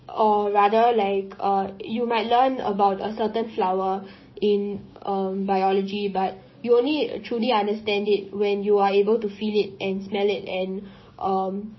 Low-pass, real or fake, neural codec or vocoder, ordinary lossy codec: 7.2 kHz; fake; codec, 16 kHz, 6 kbps, DAC; MP3, 24 kbps